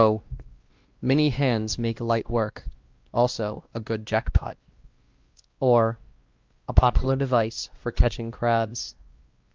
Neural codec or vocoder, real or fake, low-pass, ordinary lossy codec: codec, 16 kHz, 1 kbps, X-Codec, WavLM features, trained on Multilingual LibriSpeech; fake; 7.2 kHz; Opus, 24 kbps